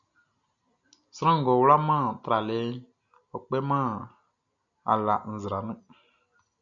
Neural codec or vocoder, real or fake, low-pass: none; real; 7.2 kHz